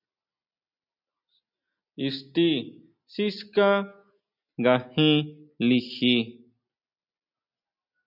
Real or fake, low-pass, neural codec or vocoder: real; 5.4 kHz; none